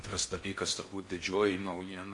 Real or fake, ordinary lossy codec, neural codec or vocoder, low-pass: fake; MP3, 48 kbps; codec, 16 kHz in and 24 kHz out, 0.6 kbps, FocalCodec, streaming, 4096 codes; 10.8 kHz